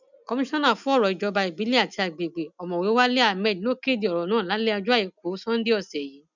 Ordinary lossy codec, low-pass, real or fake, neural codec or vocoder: none; 7.2 kHz; real; none